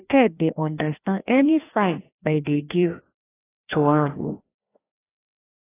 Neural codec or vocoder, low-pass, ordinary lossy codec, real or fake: codec, 16 kHz, 1 kbps, FreqCodec, larger model; 3.6 kHz; AAC, 16 kbps; fake